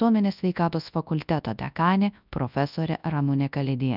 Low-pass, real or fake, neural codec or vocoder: 5.4 kHz; fake; codec, 24 kHz, 0.9 kbps, WavTokenizer, large speech release